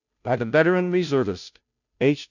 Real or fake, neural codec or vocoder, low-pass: fake; codec, 16 kHz, 0.5 kbps, FunCodec, trained on Chinese and English, 25 frames a second; 7.2 kHz